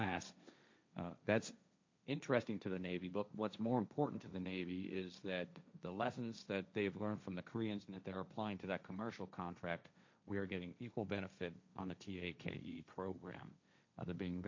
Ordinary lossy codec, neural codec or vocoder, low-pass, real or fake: MP3, 64 kbps; codec, 16 kHz, 1.1 kbps, Voila-Tokenizer; 7.2 kHz; fake